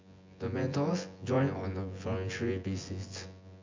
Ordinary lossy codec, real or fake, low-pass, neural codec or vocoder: MP3, 64 kbps; fake; 7.2 kHz; vocoder, 24 kHz, 100 mel bands, Vocos